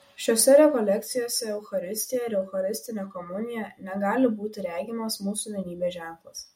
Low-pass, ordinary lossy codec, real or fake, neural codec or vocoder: 19.8 kHz; MP3, 64 kbps; real; none